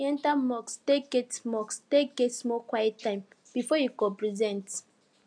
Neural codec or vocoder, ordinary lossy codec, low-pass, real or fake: none; AAC, 64 kbps; 9.9 kHz; real